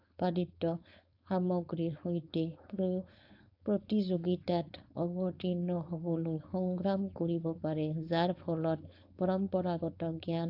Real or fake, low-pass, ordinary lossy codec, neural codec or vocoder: fake; 5.4 kHz; AAC, 48 kbps; codec, 16 kHz, 4.8 kbps, FACodec